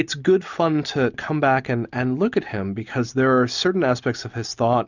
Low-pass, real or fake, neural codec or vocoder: 7.2 kHz; real; none